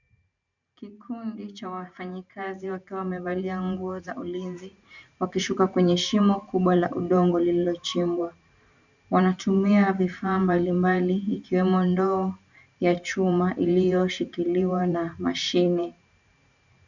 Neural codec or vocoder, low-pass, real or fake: vocoder, 44.1 kHz, 128 mel bands every 512 samples, BigVGAN v2; 7.2 kHz; fake